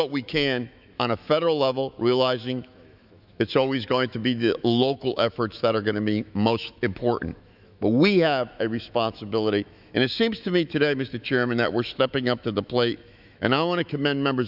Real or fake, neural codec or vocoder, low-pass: fake; autoencoder, 48 kHz, 128 numbers a frame, DAC-VAE, trained on Japanese speech; 5.4 kHz